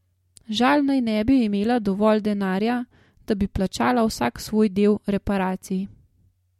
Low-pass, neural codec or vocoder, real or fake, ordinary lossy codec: 19.8 kHz; none; real; MP3, 64 kbps